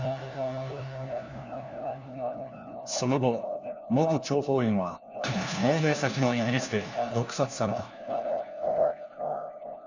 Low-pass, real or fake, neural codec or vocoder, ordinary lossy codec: 7.2 kHz; fake; codec, 16 kHz, 1 kbps, FunCodec, trained on LibriTTS, 50 frames a second; none